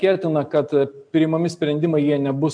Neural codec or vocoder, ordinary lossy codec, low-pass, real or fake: none; MP3, 96 kbps; 9.9 kHz; real